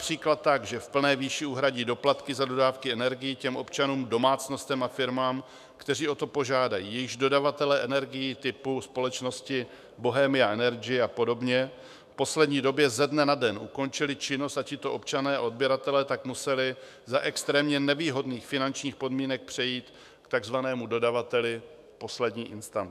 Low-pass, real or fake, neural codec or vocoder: 14.4 kHz; fake; autoencoder, 48 kHz, 128 numbers a frame, DAC-VAE, trained on Japanese speech